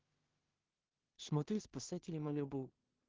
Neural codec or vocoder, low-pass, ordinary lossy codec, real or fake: codec, 16 kHz in and 24 kHz out, 0.4 kbps, LongCat-Audio-Codec, two codebook decoder; 7.2 kHz; Opus, 16 kbps; fake